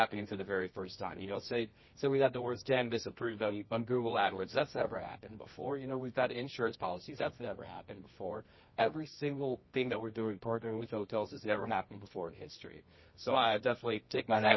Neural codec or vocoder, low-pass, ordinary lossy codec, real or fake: codec, 24 kHz, 0.9 kbps, WavTokenizer, medium music audio release; 7.2 kHz; MP3, 24 kbps; fake